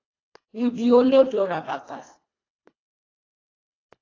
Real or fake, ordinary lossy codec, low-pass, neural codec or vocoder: fake; AAC, 32 kbps; 7.2 kHz; codec, 24 kHz, 1.5 kbps, HILCodec